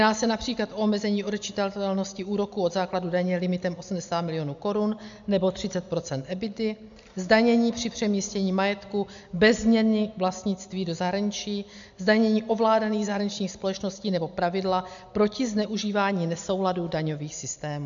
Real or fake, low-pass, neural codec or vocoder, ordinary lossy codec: real; 7.2 kHz; none; MP3, 64 kbps